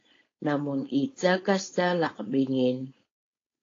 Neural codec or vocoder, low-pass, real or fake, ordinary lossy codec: codec, 16 kHz, 4.8 kbps, FACodec; 7.2 kHz; fake; AAC, 32 kbps